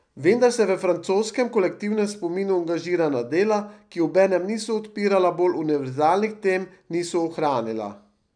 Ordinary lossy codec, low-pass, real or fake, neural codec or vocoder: none; 9.9 kHz; real; none